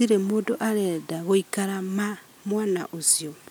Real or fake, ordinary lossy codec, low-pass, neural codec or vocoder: real; none; none; none